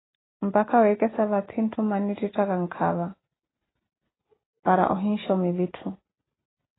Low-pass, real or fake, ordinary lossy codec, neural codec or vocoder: 7.2 kHz; real; AAC, 16 kbps; none